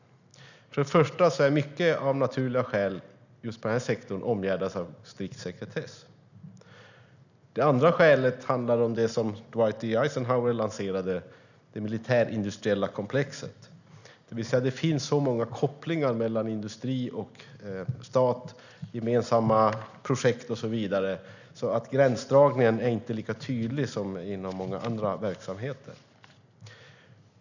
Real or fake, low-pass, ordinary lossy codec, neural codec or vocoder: real; 7.2 kHz; none; none